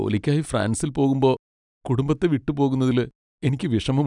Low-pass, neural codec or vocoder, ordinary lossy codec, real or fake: 10.8 kHz; none; none; real